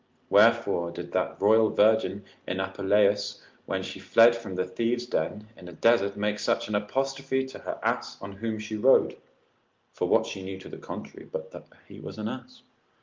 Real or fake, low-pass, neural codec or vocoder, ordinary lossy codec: real; 7.2 kHz; none; Opus, 32 kbps